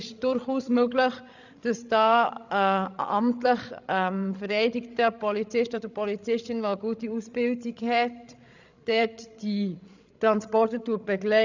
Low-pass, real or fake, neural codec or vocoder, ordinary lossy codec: 7.2 kHz; fake; codec, 16 kHz, 16 kbps, FreqCodec, larger model; none